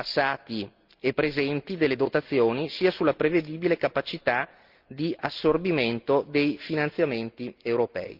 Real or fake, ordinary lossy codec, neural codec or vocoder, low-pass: real; Opus, 32 kbps; none; 5.4 kHz